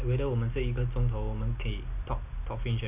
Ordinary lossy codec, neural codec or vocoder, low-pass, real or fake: none; none; 3.6 kHz; real